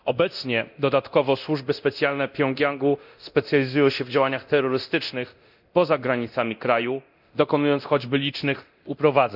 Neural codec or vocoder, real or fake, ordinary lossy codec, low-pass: codec, 24 kHz, 0.9 kbps, DualCodec; fake; none; 5.4 kHz